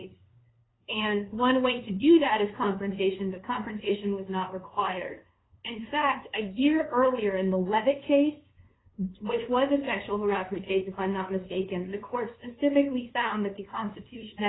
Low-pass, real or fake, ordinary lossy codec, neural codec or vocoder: 7.2 kHz; fake; AAC, 16 kbps; codec, 16 kHz, 2 kbps, FunCodec, trained on LibriTTS, 25 frames a second